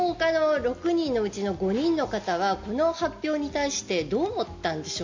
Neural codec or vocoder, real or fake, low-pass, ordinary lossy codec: none; real; 7.2 kHz; MP3, 48 kbps